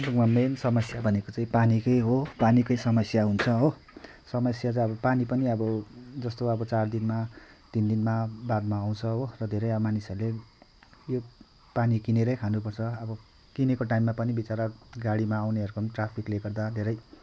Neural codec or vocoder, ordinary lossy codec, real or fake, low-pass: none; none; real; none